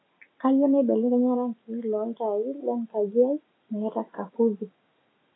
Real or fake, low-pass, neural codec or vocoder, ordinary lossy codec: real; 7.2 kHz; none; AAC, 16 kbps